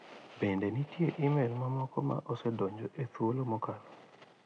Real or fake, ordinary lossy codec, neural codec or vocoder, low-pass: real; none; none; 9.9 kHz